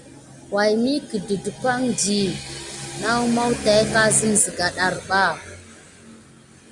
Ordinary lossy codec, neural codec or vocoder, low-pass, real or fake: Opus, 64 kbps; none; 10.8 kHz; real